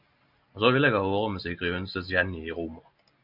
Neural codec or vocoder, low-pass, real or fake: none; 5.4 kHz; real